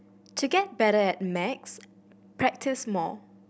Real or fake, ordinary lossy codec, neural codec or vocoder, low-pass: real; none; none; none